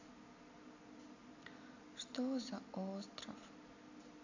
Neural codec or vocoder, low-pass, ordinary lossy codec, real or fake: none; 7.2 kHz; AAC, 48 kbps; real